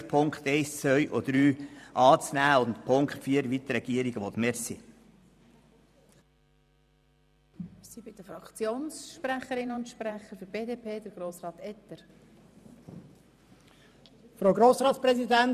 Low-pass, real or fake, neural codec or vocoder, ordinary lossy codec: 14.4 kHz; fake; vocoder, 44.1 kHz, 128 mel bands every 512 samples, BigVGAN v2; none